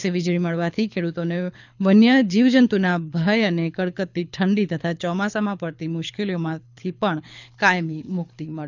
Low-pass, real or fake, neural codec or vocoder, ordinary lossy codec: 7.2 kHz; fake; codec, 24 kHz, 6 kbps, HILCodec; none